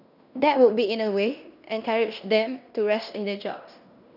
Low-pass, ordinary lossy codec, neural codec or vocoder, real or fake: 5.4 kHz; none; codec, 16 kHz in and 24 kHz out, 0.9 kbps, LongCat-Audio-Codec, fine tuned four codebook decoder; fake